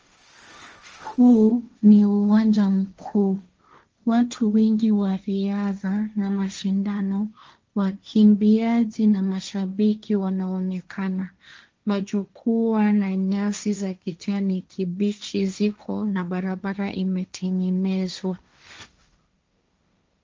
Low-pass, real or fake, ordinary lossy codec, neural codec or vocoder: 7.2 kHz; fake; Opus, 24 kbps; codec, 16 kHz, 1.1 kbps, Voila-Tokenizer